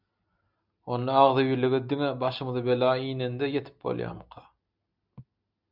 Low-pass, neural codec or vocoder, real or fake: 5.4 kHz; none; real